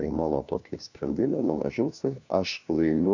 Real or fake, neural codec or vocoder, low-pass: fake; codec, 16 kHz, 1 kbps, FunCodec, trained on LibriTTS, 50 frames a second; 7.2 kHz